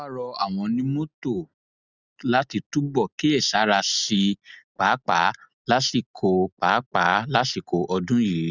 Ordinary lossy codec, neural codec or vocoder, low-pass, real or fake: none; none; 7.2 kHz; real